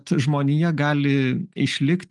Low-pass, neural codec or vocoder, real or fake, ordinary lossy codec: 10.8 kHz; none; real; Opus, 32 kbps